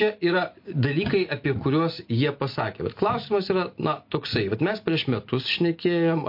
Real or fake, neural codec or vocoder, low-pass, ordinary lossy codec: real; none; 5.4 kHz; MP3, 32 kbps